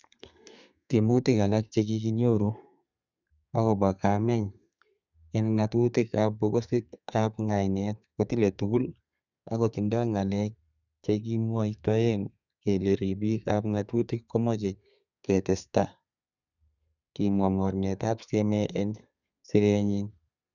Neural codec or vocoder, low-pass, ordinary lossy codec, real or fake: codec, 32 kHz, 1.9 kbps, SNAC; 7.2 kHz; none; fake